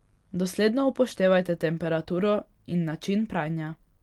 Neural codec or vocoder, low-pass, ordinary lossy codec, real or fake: none; 19.8 kHz; Opus, 24 kbps; real